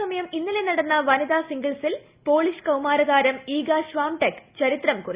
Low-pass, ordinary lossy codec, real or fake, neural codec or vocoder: 3.6 kHz; Opus, 64 kbps; real; none